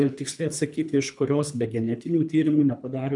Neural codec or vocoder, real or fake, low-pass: codec, 24 kHz, 3 kbps, HILCodec; fake; 10.8 kHz